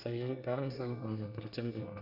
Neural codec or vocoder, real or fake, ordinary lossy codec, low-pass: codec, 24 kHz, 1 kbps, SNAC; fake; none; 5.4 kHz